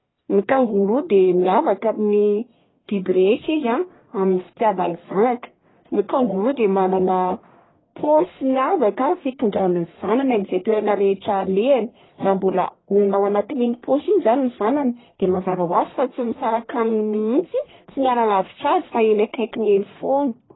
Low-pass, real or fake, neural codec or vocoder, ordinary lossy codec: 7.2 kHz; fake; codec, 44.1 kHz, 1.7 kbps, Pupu-Codec; AAC, 16 kbps